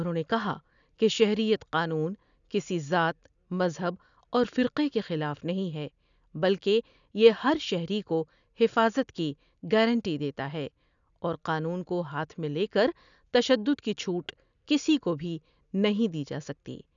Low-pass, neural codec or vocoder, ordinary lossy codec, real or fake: 7.2 kHz; none; none; real